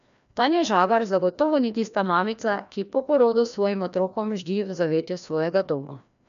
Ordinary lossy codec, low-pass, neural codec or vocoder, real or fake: none; 7.2 kHz; codec, 16 kHz, 1 kbps, FreqCodec, larger model; fake